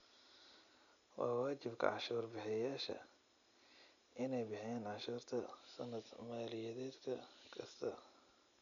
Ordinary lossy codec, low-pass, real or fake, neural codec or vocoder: none; 7.2 kHz; real; none